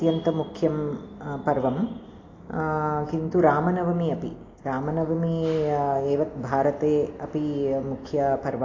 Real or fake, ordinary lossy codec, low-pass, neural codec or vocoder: real; AAC, 32 kbps; 7.2 kHz; none